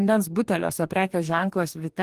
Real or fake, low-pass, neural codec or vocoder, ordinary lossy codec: fake; 14.4 kHz; codec, 44.1 kHz, 2.6 kbps, DAC; Opus, 24 kbps